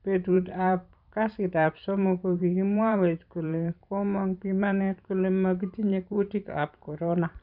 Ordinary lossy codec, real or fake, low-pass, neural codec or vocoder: none; fake; 5.4 kHz; vocoder, 44.1 kHz, 128 mel bands every 512 samples, BigVGAN v2